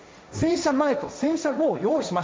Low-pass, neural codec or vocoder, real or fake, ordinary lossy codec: none; codec, 16 kHz, 1.1 kbps, Voila-Tokenizer; fake; none